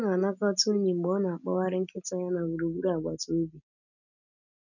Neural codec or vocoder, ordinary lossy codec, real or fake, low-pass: none; none; real; 7.2 kHz